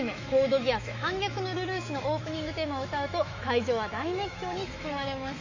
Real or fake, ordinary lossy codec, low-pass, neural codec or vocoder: fake; AAC, 48 kbps; 7.2 kHz; autoencoder, 48 kHz, 128 numbers a frame, DAC-VAE, trained on Japanese speech